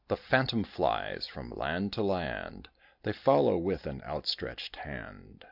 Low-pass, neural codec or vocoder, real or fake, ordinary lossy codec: 5.4 kHz; none; real; AAC, 48 kbps